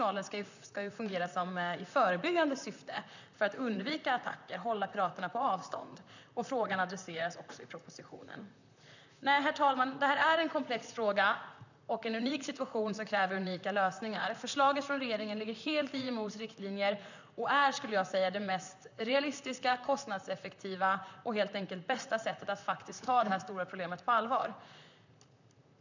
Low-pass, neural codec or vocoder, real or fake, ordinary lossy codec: 7.2 kHz; vocoder, 44.1 kHz, 128 mel bands, Pupu-Vocoder; fake; none